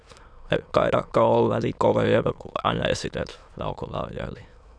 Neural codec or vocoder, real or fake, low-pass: autoencoder, 22.05 kHz, a latent of 192 numbers a frame, VITS, trained on many speakers; fake; 9.9 kHz